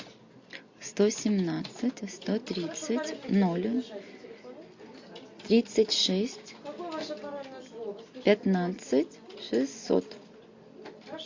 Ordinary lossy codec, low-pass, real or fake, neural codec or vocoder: MP3, 48 kbps; 7.2 kHz; real; none